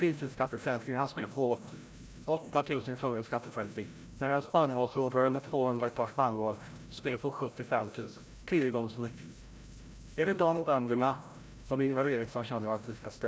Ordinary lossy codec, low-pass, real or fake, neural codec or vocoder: none; none; fake; codec, 16 kHz, 0.5 kbps, FreqCodec, larger model